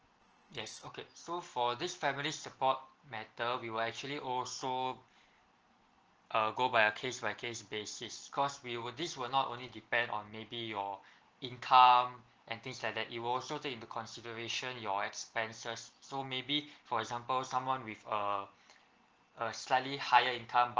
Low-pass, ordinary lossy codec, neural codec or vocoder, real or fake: 7.2 kHz; Opus, 16 kbps; none; real